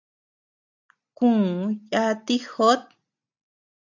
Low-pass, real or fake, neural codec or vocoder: 7.2 kHz; real; none